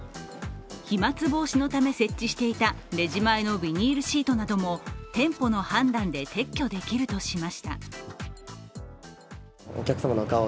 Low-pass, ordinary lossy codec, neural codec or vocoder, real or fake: none; none; none; real